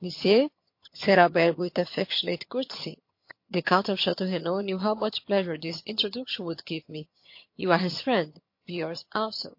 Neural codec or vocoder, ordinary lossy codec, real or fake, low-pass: vocoder, 22.05 kHz, 80 mel bands, HiFi-GAN; MP3, 32 kbps; fake; 5.4 kHz